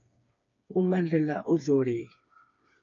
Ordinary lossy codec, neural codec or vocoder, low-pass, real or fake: AAC, 48 kbps; codec, 16 kHz, 4 kbps, FreqCodec, smaller model; 7.2 kHz; fake